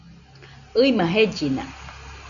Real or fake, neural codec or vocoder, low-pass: real; none; 7.2 kHz